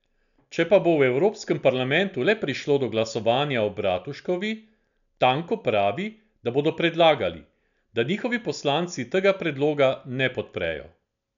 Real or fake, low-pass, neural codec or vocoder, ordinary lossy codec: real; 7.2 kHz; none; none